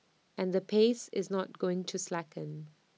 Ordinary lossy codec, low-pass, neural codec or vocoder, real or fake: none; none; none; real